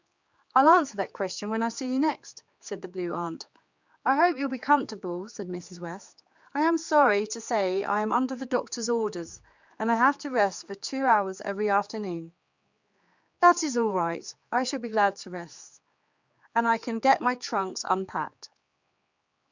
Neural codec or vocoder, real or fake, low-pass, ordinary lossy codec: codec, 16 kHz, 4 kbps, X-Codec, HuBERT features, trained on general audio; fake; 7.2 kHz; Opus, 64 kbps